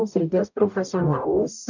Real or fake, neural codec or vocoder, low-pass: fake; codec, 44.1 kHz, 0.9 kbps, DAC; 7.2 kHz